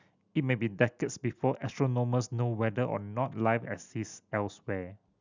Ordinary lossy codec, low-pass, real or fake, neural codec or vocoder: Opus, 64 kbps; 7.2 kHz; real; none